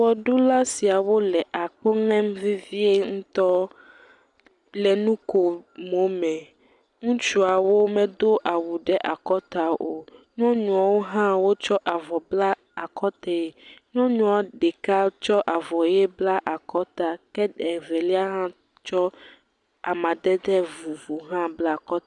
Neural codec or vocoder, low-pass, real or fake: none; 10.8 kHz; real